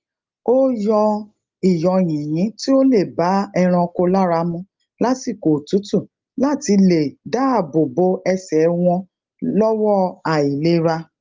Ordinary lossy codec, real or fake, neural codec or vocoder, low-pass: Opus, 32 kbps; real; none; 7.2 kHz